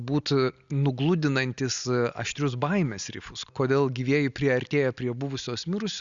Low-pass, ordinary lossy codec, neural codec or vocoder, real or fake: 7.2 kHz; Opus, 64 kbps; none; real